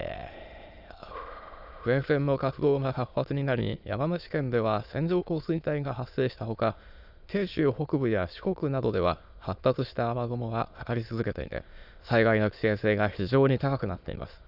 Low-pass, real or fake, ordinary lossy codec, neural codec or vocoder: 5.4 kHz; fake; none; autoencoder, 22.05 kHz, a latent of 192 numbers a frame, VITS, trained on many speakers